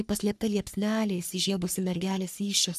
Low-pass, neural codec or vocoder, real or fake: 14.4 kHz; codec, 44.1 kHz, 3.4 kbps, Pupu-Codec; fake